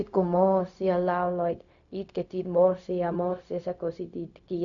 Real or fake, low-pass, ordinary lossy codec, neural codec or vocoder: fake; 7.2 kHz; none; codec, 16 kHz, 0.4 kbps, LongCat-Audio-Codec